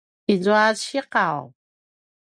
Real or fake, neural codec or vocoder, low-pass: real; none; 9.9 kHz